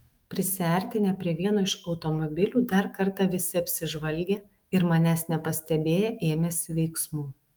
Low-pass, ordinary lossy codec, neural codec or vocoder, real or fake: 19.8 kHz; Opus, 32 kbps; autoencoder, 48 kHz, 128 numbers a frame, DAC-VAE, trained on Japanese speech; fake